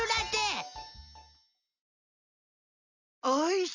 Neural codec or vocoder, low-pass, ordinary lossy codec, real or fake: none; 7.2 kHz; none; real